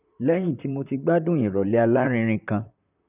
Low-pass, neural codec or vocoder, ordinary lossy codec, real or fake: 3.6 kHz; vocoder, 44.1 kHz, 128 mel bands, Pupu-Vocoder; none; fake